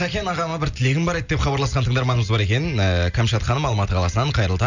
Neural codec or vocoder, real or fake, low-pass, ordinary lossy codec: none; real; 7.2 kHz; none